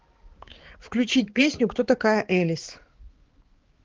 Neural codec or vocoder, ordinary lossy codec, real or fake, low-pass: codec, 16 kHz, 4 kbps, X-Codec, HuBERT features, trained on balanced general audio; Opus, 16 kbps; fake; 7.2 kHz